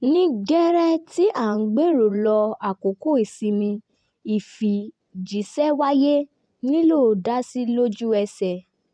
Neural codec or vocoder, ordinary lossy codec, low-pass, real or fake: vocoder, 24 kHz, 100 mel bands, Vocos; none; 9.9 kHz; fake